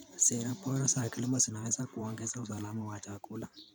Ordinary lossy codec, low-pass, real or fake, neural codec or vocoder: none; none; fake; vocoder, 44.1 kHz, 128 mel bands every 512 samples, BigVGAN v2